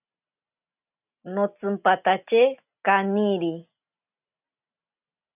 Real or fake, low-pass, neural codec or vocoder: real; 3.6 kHz; none